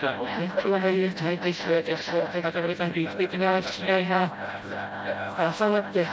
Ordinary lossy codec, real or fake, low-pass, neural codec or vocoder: none; fake; none; codec, 16 kHz, 0.5 kbps, FreqCodec, smaller model